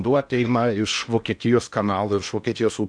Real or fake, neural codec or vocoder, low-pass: fake; codec, 16 kHz in and 24 kHz out, 0.8 kbps, FocalCodec, streaming, 65536 codes; 9.9 kHz